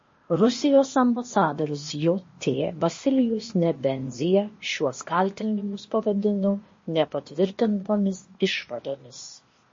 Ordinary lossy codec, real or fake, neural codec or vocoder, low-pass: MP3, 32 kbps; fake; codec, 16 kHz, 0.8 kbps, ZipCodec; 7.2 kHz